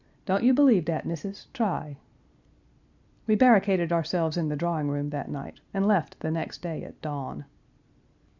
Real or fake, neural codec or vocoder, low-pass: real; none; 7.2 kHz